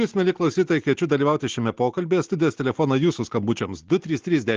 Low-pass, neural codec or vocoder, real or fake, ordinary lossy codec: 7.2 kHz; none; real; Opus, 16 kbps